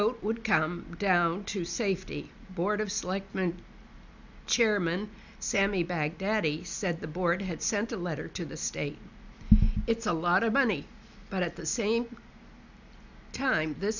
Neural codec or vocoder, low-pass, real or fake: none; 7.2 kHz; real